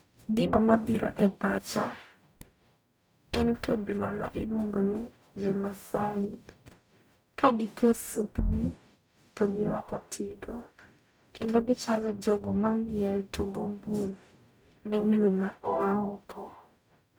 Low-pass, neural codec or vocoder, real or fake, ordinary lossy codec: none; codec, 44.1 kHz, 0.9 kbps, DAC; fake; none